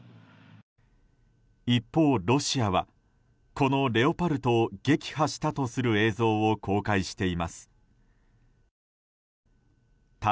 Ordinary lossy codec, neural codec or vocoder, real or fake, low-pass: none; none; real; none